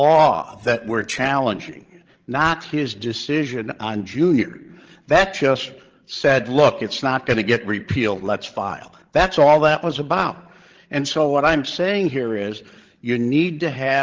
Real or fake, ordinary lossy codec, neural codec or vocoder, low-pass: fake; Opus, 16 kbps; codec, 16 kHz, 16 kbps, FreqCodec, larger model; 7.2 kHz